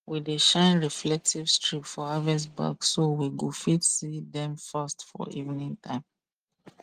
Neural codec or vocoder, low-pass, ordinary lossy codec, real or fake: none; 14.4 kHz; Opus, 24 kbps; real